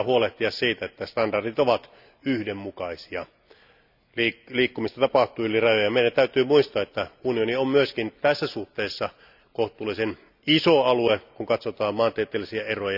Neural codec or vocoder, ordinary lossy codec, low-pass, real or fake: none; none; 5.4 kHz; real